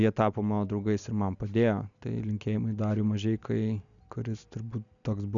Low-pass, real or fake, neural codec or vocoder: 7.2 kHz; real; none